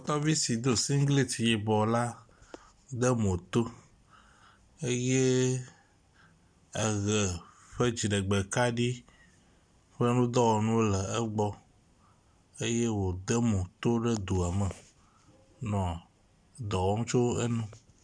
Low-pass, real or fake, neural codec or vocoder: 9.9 kHz; real; none